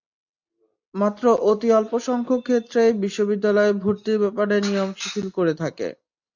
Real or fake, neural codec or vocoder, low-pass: real; none; 7.2 kHz